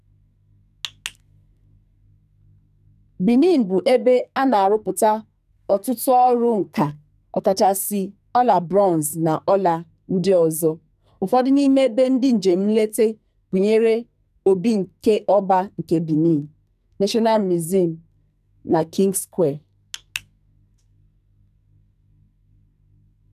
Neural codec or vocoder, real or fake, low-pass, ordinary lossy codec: codec, 44.1 kHz, 2.6 kbps, SNAC; fake; 14.4 kHz; none